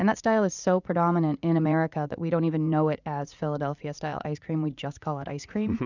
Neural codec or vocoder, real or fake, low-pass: vocoder, 44.1 kHz, 80 mel bands, Vocos; fake; 7.2 kHz